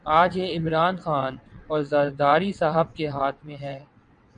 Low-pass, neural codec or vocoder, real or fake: 9.9 kHz; vocoder, 22.05 kHz, 80 mel bands, WaveNeXt; fake